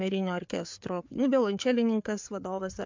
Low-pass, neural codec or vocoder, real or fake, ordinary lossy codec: 7.2 kHz; codec, 16 kHz, 4 kbps, FreqCodec, larger model; fake; MP3, 64 kbps